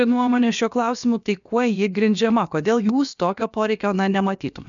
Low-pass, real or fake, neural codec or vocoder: 7.2 kHz; fake; codec, 16 kHz, about 1 kbps, DyCAST, with the encoder's durations